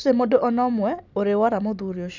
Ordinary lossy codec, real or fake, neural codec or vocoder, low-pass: none; real; none; 7.2 kHz